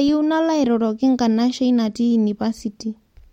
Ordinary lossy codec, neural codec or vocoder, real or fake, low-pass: MP3, 64 kbps; none; real; 19.8 kHz